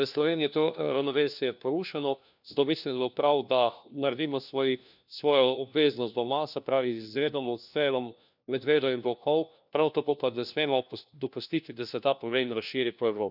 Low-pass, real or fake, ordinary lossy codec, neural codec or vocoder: 5.4 kHz; fake; none; codec, 16 kHz, 1 kbps, FunCodec, trained on LibriTTS, 50 frames a second